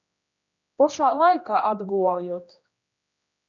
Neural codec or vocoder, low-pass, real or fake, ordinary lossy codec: codec, 16 kHz, 1 kbps, X-Codec, HuBERT features, trained on general audio; 7.2 kHz; fake; MP3, 96 kbps